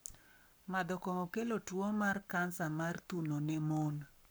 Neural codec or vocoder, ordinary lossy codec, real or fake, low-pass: codec, 44.1 kHz, 7.8 kbps, Pupu-Codec; none; fake; none